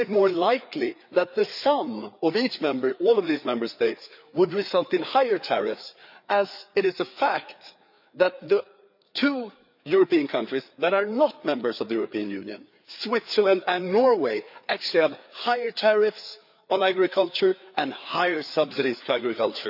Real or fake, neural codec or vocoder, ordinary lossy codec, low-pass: fake; codec, 16 kHz, 4 kbps, FreqCodec, larger model; none; 5.4 kHz